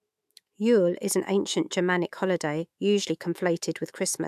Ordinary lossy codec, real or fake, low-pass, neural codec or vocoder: AAC, 96 kbps; fake; 14.4 kHz; autoencoder, 48 kHz, 128 numbers a frame, DAC-VAE, trained on Japanese speech